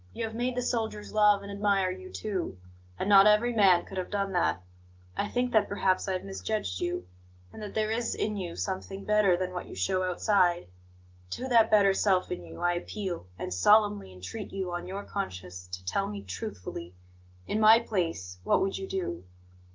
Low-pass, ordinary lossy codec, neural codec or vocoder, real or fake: 7.2 kHz; Opus, 32 kbps; none; real